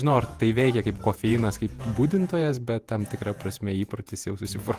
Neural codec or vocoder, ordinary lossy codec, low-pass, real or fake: vocoder, 48 kHz, 128 mel bands, Vocos; Opus, 24 kbps; 14.4 kHz; fake